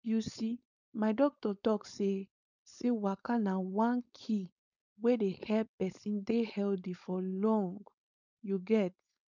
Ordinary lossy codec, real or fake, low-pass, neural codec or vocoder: none; fake; 7.2 kHz; codec, 16 kHz, 4.8 kbps, FACodec